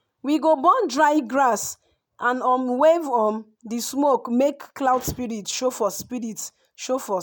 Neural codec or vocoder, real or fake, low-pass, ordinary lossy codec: none; real; none; none